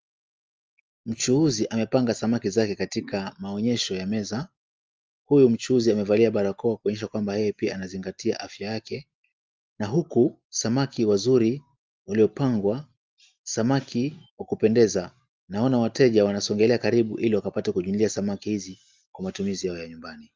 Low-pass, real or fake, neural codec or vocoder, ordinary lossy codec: 7.2 kHz; real; none; Opus, 24 kbps